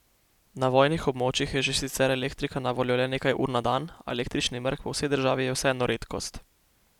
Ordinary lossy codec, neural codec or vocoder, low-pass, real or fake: none; none; 19.8 kHz; real